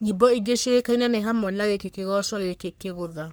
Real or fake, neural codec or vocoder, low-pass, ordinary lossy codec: fake; codec, 44.1 kHz, 3.4 kbps, Pupu-Codec; none; none